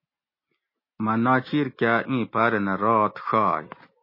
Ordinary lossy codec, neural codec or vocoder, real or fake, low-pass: MP3, 24 kbps; none; real; 5.4 kHz